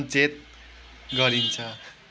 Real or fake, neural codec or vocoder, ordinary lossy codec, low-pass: real; none; none; none